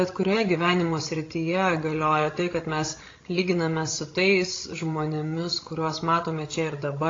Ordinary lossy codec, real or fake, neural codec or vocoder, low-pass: AAC, 32 kbps; fake; codec, 16 kHz, 16 kbps, FreqCodec, larger model; 7.2 kHz